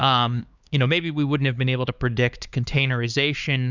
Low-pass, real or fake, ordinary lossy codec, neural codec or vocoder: 7.2 kHz; fake; Opus, 64 kbps; codec, 16 kHz, 4 kbps, X-Codec, HuBERT features, trained on LibriSpeech